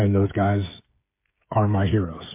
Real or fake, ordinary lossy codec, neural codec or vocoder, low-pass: fake; MP3, 24 kbps; codec, 16 kHz, 8 kbps, FreqCodec, smaller model; 3.6 kHz